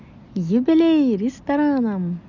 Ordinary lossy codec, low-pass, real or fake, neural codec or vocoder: none; 7.2 kHz; real; none